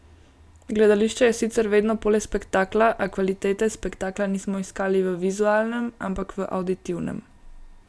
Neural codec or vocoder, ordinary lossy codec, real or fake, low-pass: none; none; real; none